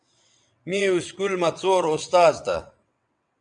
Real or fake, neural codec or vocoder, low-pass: fake; vocoder, 22.05 kHz, 80 mel bands, WaveNeXt; 9.9 kHz